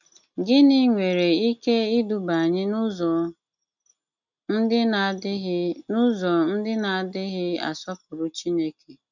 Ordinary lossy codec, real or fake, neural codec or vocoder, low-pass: none; real; none; 7.2 kHz